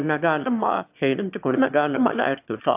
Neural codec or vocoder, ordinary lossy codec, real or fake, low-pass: autoencoder, 22.05 kHz, a latent of 192 numbers a frame, VITS, trained on one speaker; AAC, 32 kbps; fake; 3.6 kHz